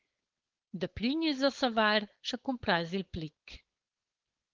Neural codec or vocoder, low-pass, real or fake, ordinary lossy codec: codec, 16 kHz, 4.8 kbps, FACodec; 7.2 kHz; fake; Opus, 16 kbps